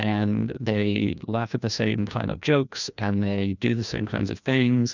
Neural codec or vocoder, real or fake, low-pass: codec, 16 kHz, 1 kbps, FreqCodec, larger model; fake; 7.2 kHz